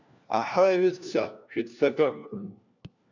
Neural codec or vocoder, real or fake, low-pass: codec, 16 kHz, 1 kbps, FunCodec, trained on LibriTTS, 50 frames a second; fake; 7.2 kHz